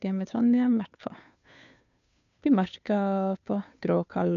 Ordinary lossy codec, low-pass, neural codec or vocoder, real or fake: none; 7.2 kHz; codec, 16 kHz, 2 kbps, FunCodec, trained on Chinese and English, 25 frames a second; fake